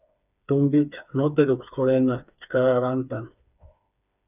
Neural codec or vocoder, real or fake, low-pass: codec, 16 kHz, 4 kbps, FreqCodec, smaller model; fake; 3.6 kHz